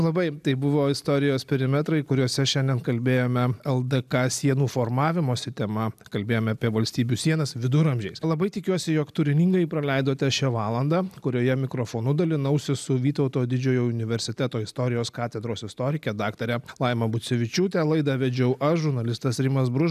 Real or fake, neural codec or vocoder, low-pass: real; none; 14.4 kHz